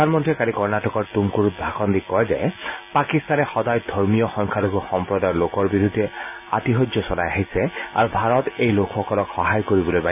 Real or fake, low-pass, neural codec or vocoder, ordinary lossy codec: real; 3.6 kHz; none; none